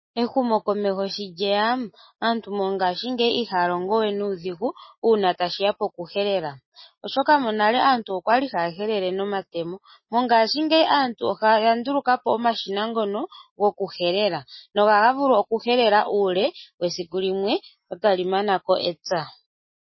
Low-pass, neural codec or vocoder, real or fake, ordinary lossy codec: 7.2 kHz; none; real; MP3, 24 kbps